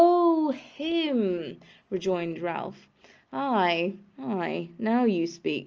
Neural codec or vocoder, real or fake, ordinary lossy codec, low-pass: none; real; Opus, 24 kbps; 7.2 kHz